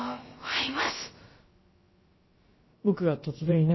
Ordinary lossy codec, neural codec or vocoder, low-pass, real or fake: MP3, 24 kbps; codec, 16 kHz, about 1 kbps, DyCAST, with the encoder's durations; 7.2 kHz; fake